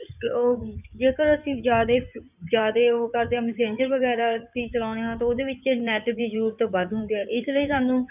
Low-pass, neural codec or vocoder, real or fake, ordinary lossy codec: 3.6 kHz; codec, 16 kHz in and 24 kHz out, 2.2 kbps, FireRedTTS-2 codec; fake; none